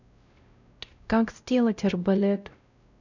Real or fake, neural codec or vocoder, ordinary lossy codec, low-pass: fake; codec, 16 kHz, 0.5 kbps, X-Codec, WavLM features, trained on Multilingual LibriSpeech; none; 7.2 kHz